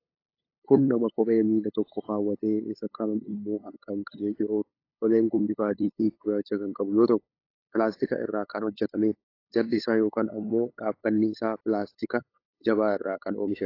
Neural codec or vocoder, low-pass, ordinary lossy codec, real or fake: codec, 16 kHz, 8 kbps, FunCodec, trained on LibriTTS, 25 frames a second; 5.4 kHz; AAC, 32 kbps; fake